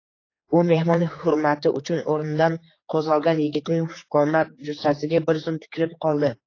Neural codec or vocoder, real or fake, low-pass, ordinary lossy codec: codec, 16 kHz, 4 kbps, X-Codec, HuBERT features, trained on general audio; fake; 7.2 kHz; AAC, 32 kbps